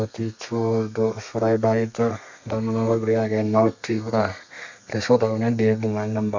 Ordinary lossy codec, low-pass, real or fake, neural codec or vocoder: MP3, 64 kbps; 7.2 kHz; fake; codec, 32 kHz, 1.9 kbps, SNAC